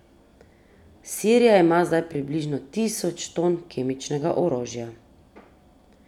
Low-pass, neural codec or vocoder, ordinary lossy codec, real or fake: 19.8 kHz; none; none; real